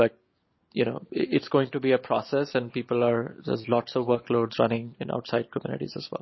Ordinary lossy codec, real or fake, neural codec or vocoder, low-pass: MP3, 24 kbps; real; none; 7.2 kHz